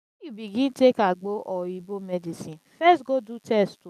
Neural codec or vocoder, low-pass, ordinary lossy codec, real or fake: none; 14.4 kHz; none; real